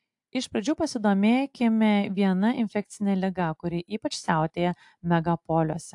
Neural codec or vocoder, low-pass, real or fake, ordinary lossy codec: none; 10.8 kHz; real; AAC, 64 kbps